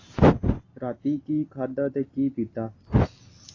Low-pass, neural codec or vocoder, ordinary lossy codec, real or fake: 7.2 kHz; none; AAC, 32 kbps; real